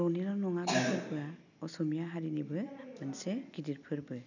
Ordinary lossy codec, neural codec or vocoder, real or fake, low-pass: none; none; real; 7.2 kHz